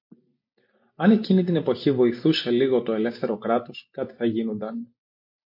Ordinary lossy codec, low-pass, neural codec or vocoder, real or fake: MP3, 32 kbps; 5.4 kHz; none; real